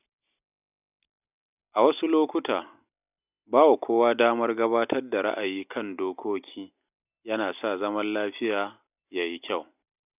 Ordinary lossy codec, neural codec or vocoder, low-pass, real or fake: none; none; 3.6 kHz; real